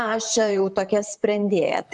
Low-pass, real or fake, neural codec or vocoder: 10.8 kHz; fake; vocoder, 44.1 kHz, 128 mel bands, Pupu-Vocoder